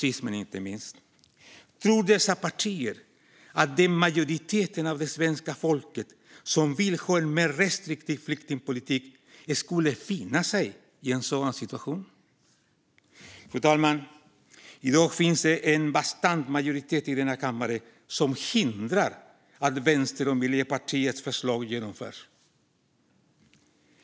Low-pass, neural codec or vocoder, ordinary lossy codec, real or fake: none; none; none; real